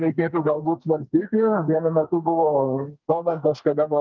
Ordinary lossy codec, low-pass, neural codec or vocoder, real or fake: Opus, 32 kbps; 7.2 kHz; codec, 44.1 kHz, 2.6 kbps, SNAC; fake